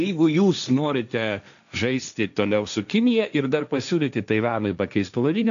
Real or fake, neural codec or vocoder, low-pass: fake; codec, 16 kHz, 1.1 kbps, Voila-Tokenizer; 7.2 kHz